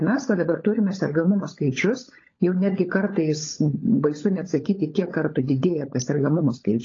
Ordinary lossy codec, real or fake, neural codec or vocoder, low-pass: AAC, 32 kbps; fake; codec, 16 kHz, 16 kbps, FunCodec, trained on LibriTTS, 50 frames a second; 7.2 kHz